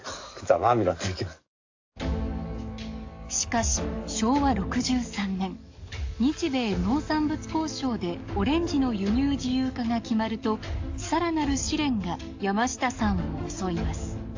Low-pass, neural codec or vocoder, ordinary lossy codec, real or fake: 7.2 kHz; codec, 44.1 kHz, 7.8 kbps, DAC; AAC, 48 kbps; fake